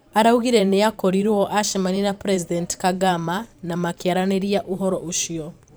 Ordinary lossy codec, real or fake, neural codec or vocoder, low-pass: none; fake; vocoder, 44.1 kHz, 128 mel bands every 512 samples, BigVGAN v2; none